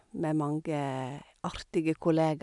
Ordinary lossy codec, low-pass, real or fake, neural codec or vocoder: none; 10.8 kHz; real; none